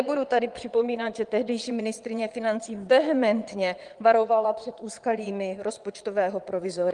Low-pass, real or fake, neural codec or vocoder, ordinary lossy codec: 9.9 kHz; fake; vocoder, 22.05 kHz, 80 mel bands, Vocos; Opus, 24 kbps